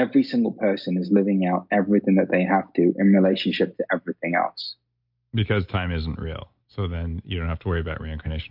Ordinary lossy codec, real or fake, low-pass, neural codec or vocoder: MP3, 48 kbps; real; 5.4 kHz; none